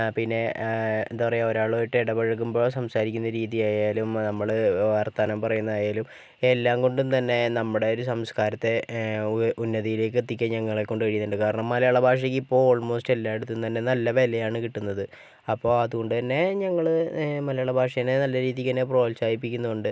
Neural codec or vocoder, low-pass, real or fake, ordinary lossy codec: none; none; real; none